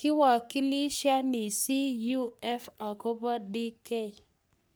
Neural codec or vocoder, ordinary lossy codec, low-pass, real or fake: codec, 44.1 kHz, 3.4 kbps, Pupu-Codec; none; none; fake